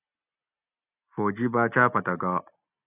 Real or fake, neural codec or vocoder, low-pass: real; none; 3.6 kHz